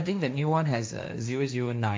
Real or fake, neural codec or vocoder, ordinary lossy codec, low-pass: fake; codec, 16 kHz, 1.1 kbps, Voila-Tokenizer; none; none